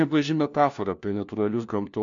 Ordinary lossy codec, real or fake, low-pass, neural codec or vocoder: MP3, 64 kbps; fake; 7.2 kHz; codec, 16 kHz, 1 kbps, FunCodec, trained on LibriTTS, 50 frames a second